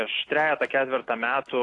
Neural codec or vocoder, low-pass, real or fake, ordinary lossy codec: none; 10.8 kHz; real; AAC, 48 kbps